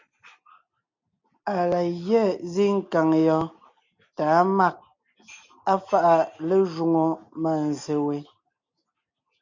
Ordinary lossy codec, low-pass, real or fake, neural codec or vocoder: MP3, 64 kbps; 7.2 kHz; real; none